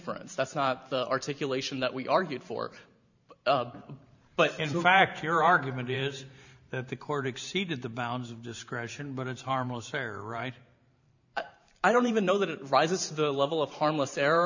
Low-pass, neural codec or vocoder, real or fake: 7.2 kHz; vocoder, 44.1 kHz, 128 mel bands every 512 samples, BigVGAN v2; fake